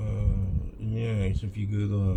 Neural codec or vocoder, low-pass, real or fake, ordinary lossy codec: none; 19.8 kHz; real; none